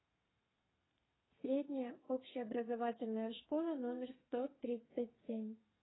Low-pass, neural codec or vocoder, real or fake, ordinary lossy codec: 7.2 kHz; codec, 32 kHz, 1.9 kbps, SNAC; fake; AAC, 16 kbps